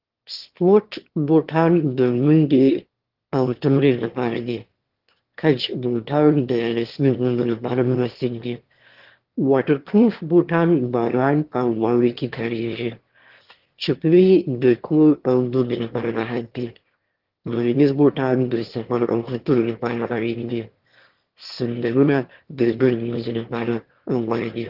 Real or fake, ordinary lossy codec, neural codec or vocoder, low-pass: fake; Opus, 16 kbps; autoencoder, 22.05 kHz, a latent of 192 numbers a frame, VITS, trained on one speaker; 5.4 kHz